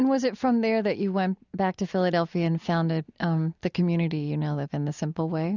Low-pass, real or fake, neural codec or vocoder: 7.2 kHz; real; none